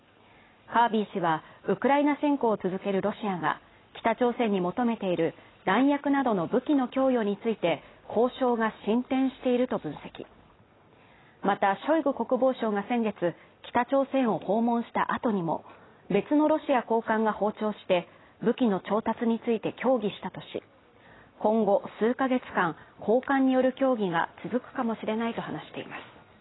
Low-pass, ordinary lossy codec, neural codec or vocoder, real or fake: 7.2 kHz; AAC, 16 kbps; none; real